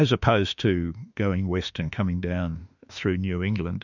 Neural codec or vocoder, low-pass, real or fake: autoencoder, 48 kHz, 32 numbers a frame, DAC-VAE, trained on Japanese speech; 7.2 kHz; fake